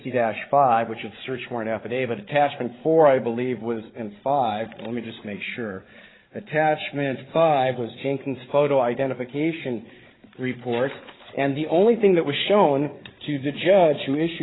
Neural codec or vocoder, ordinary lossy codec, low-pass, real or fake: codec, 16 kHz, 8 kbps, FreqCodec, larger model; AAC, 16 kbps; 7.2 kHz; fake